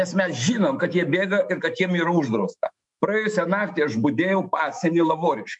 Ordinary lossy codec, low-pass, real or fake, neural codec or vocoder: MP3, 64 kbps; 10.8 kHz; fake; autoencoder, 48 kHz, 128 numbers a frame, DAC-VAE, trained on Japanese speech